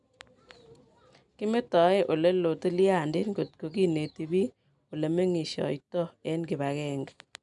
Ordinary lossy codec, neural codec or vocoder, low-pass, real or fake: none; none; 10.8 kHz; real